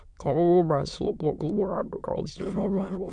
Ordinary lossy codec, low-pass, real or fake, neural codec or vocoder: none; 9.9 kHz; fake; autoencoder, 22.05 kHz, a latent of 192 numbers a frame, VITS, trained on many speakers